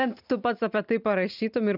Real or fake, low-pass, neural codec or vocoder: real; 5.4 kHz; none